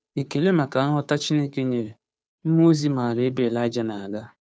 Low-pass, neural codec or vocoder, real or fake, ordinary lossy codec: none; codec, 16 kHz, 2 kbps, FunCodec, trained on Chinese and English, 25 frames a second; fake; none